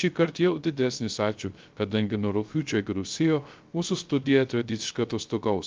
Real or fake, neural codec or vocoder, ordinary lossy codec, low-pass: fake; codec, 16 kHz, 0.3 kbps, FocalCodec; Opus, 24 kbps; 7.2 kHz